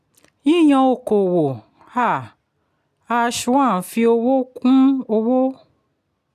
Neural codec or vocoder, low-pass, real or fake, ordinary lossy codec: none; 14.4 kHz; real; none